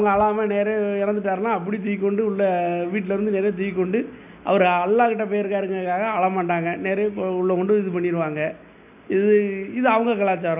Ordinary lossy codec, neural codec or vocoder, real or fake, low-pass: none; none; real; 3.6 kHz